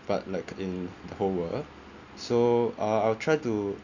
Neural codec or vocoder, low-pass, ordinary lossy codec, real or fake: none; 7.2 kHz; none; real